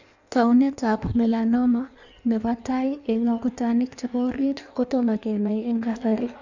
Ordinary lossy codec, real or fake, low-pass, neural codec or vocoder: none; fake; 7.2 kHz; codec, 16 kHz in and 24 kHz out, 1.1 kbps, FireRedTTS-2 codec